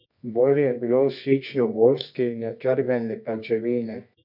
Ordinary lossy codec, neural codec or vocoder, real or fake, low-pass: none; codec, 24 kHz, 0.9 kbps, WavTokenizer, medium music audio release; fake; 5.4 kHz